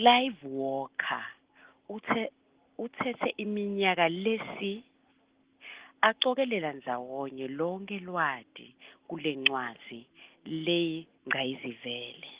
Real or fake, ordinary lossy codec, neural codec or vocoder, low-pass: real; Opus, 16 kbps; none; 3.6 kHz